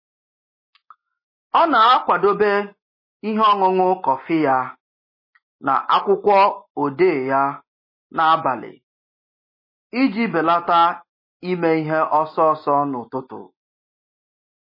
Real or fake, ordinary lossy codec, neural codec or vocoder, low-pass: real; MP3, 24 kbps; none; 5.4 kHz